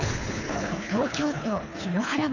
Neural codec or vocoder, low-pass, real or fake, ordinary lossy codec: codec, 24 kHz, 3 kbps, HILCodec; 7.2 kHz; fake; none